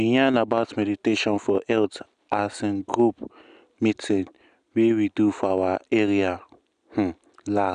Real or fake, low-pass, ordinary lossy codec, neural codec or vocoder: real; 9.9 kHz; none; none